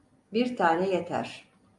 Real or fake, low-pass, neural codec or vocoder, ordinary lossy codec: real; 10.8 kHz; none; AAC, 64 kbps